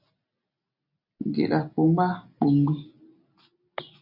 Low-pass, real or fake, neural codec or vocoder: 5.4 kHz; real; none